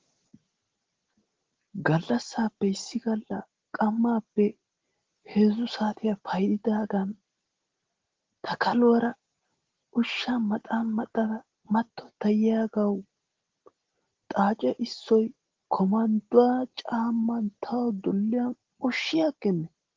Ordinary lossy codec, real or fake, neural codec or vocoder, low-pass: Opus, 16 kbps; real; none; 7.2 kHz